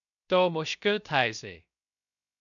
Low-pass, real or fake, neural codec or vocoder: 7.2 kHz; fake; codec, 16 kHz, 0.3 kbps, FocalCodec